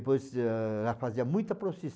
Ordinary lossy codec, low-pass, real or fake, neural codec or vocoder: none; none; real; none